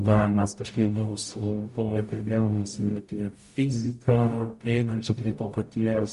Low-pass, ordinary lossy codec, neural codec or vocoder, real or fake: 14.4 kHz; MP3, 48 kbps; codec, 44.1 kHz, 0.9 kbps, DAC; fake